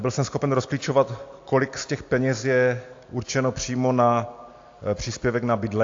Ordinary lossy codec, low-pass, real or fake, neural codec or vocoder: AAC, 48 kbps; 7.2 kHz; real; none